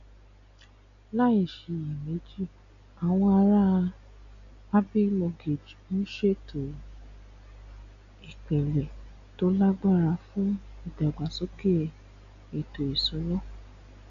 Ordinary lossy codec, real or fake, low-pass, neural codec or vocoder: none; real; 7.2 kHz; none